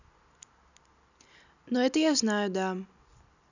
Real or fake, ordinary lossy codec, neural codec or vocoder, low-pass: real; none; none; 7.2 kHz